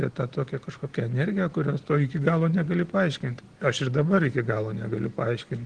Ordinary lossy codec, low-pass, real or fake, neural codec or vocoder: Opus, 16 kbps; 9.9 kHz; real; none